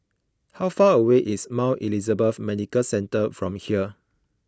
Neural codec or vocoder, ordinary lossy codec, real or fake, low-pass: none; none; real; none